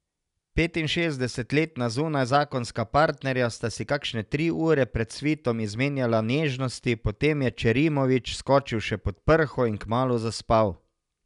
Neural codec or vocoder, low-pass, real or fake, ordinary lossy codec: none; 10.8 kHz; real; none